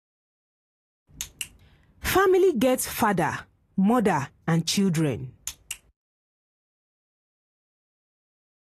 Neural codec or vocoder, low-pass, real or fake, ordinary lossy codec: none; 14.4 kHz; real; AAC, 48 kbps